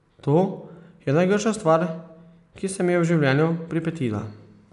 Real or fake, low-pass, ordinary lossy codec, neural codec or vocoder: real; 10.8 kHz; none; none